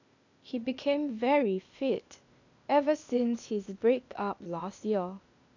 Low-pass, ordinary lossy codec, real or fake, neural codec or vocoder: 7.2 kHz; none; fake; codec, 16 kHz, 0.8 kbps, ZipCodec